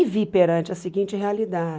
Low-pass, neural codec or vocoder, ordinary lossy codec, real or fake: none; none; none; real